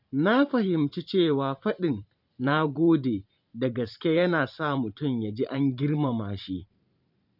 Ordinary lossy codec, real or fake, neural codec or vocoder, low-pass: none; fake; vocoder, 44.1 kHz, 128 mel bands every 512 samples, BigVGAN v2; 5.4 kHz